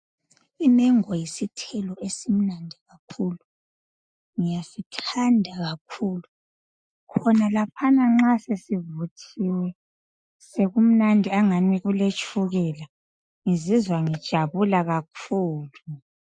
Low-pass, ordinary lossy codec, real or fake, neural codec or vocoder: 9.9 kHz; MP3, 64 kbps; real; none